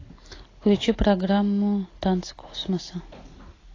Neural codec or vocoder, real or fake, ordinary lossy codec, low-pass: none; real; AAC, 32 kbps; 7.2 kHz